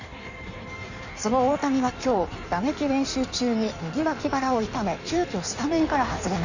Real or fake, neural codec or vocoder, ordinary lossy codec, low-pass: fake; codec, 16 kHz in and 24 kHz out, 1.1 kbps, FireRedTTS-2 codec; none; 7.2 kHz